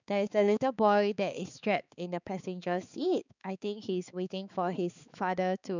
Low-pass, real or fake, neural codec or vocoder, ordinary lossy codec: 7.2 kHz; fake; codec, 16 kHz, 4 kbps, X-Codec, HuBERT features, trained on balanced general audio; none